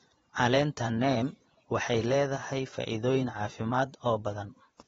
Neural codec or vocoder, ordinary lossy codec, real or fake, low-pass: vocoder, 24 kHz, 100 mel bands, Vocos; AAC, 24 kbps; fake; 10.8 kHz